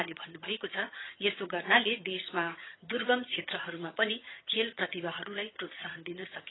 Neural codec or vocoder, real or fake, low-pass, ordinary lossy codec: vocoder, 22.05 kHz, 80 mel bands, HiFi-GAN; fake; 7.2 kHz; AAC, 16 kbps